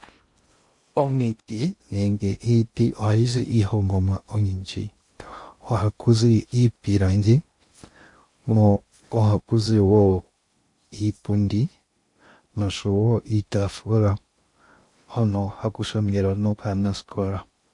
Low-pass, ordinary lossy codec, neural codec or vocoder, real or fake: 10.8 kHz; MP3, 48 kbps; codec, 16 kHz in and 24 kHz out, 0.6 kbps, FocalCodec, streaming, 2048 codes; fake